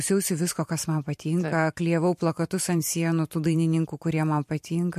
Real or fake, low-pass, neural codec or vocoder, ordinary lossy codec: fake; 14.4 kHz; vocoder, 44.1 kHz, 128 mel bands every 512 samples, BigVGAN v2; MP3, 64 kbps